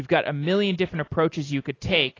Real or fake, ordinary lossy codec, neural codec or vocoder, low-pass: real; AAC, 32 kbps; none; 7.2 kHz